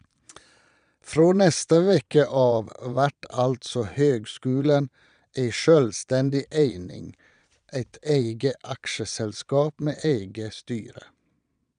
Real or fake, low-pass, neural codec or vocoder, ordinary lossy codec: fake; 9.9 kHz; vocoder, 22.05 kHz, 80 mel bands, Vocos; none